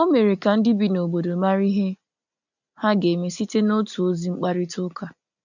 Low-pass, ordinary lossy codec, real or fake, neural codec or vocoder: 7.2 kHz; none; real; none